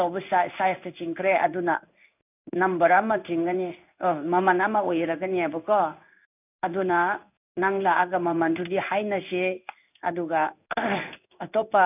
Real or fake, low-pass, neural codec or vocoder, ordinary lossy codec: fake; 3.6 kHz; codec, 16 kHz in and 24 kHz out, 1 kbps, XY-Tokenizer; none